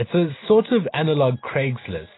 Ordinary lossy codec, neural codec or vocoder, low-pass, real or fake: AAC, 16 kbps; none; 7.2 kHz; real